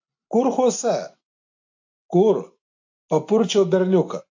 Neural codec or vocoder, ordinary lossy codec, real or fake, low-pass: none; AAC, 48 kbps; real; 7.2 kHz